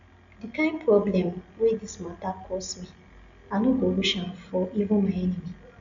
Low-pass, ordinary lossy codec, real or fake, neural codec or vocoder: 7.2 kHz; none; real; none